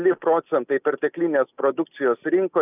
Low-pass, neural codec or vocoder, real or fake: 3.6 kHz; none; real